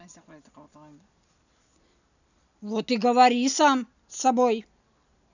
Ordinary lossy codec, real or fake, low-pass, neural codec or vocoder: none; real; 7.2 kHz; none